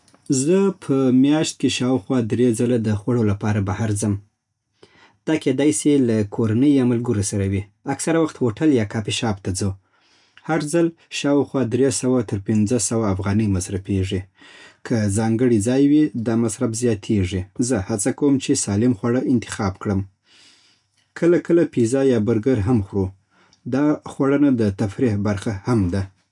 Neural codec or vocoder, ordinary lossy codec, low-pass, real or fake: none; none; 10.8 kHz; real